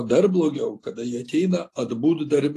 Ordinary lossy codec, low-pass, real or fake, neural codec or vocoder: AAC, 48 kbps; 14.4 kHz; real; none